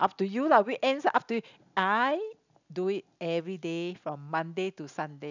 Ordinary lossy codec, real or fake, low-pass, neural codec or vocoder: none; real; 7.2 kHz; none